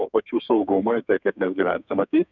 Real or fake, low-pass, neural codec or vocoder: fake; 7.2 kHz; codec, 32 kHz, 1.9 kbps, SNAC